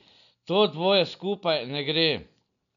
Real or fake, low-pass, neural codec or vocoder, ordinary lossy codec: real; 7.2 kHz; none; none